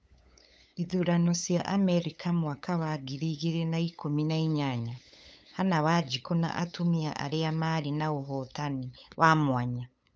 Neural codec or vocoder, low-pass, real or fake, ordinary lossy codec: codec, 16 kHz, 8 kbps, FunCodec, trained on LibriTTS, 25 frames a second; none; fake; none